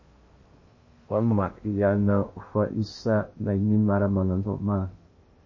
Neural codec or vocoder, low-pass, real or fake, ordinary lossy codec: codec, 16 kHz in and 24 kHz out, 0.8 kbps, FocalCodec, streaming, 65536 codes; 7.2 kHz; fake; MP3, 32 kbps